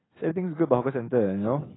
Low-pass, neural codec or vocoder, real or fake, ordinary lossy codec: 7.2 kHz; none; real; AAC, 16 kbps